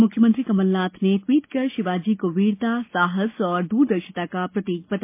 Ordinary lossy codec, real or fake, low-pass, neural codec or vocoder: MP3, 24 kbps; real; 3.6 kHz; none